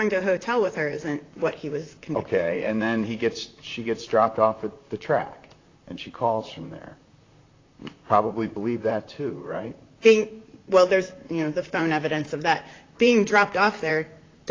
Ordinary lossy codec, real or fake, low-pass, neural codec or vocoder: AAC, 32 kbps; fake; 7.2 kHz; vocoder, 44.1 kHz, 128 mel bands, Pupu-Vocoder